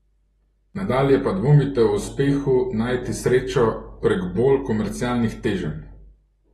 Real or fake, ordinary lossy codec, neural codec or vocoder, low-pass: real; AAC, 32 kbps; none; 14.4 kHz